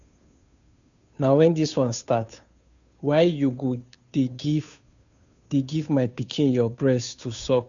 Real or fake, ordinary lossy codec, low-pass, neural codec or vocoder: fake; none; 7.2 kHz; codec, 16 kHz, 2 kbps, FunCodec, trained on Chinese and English, 25 frames a second